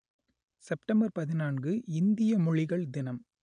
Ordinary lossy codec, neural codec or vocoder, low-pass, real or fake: none; none; 9.9 kHz; real